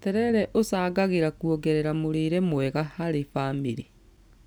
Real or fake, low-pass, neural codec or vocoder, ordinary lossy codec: real; none; none; none